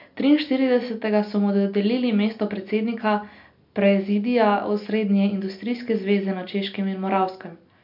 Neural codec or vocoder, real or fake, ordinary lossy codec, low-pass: none; real; MP3, 48 kbps; 5.4 kHz